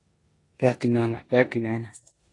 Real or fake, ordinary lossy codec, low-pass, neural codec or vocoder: fake; AAC, 32 kbps; 10.8 kHz; codec, 16 kHz in and 24 kHz out, 0.9 kbps, LongCat-Audio-Codec, four codebook decoder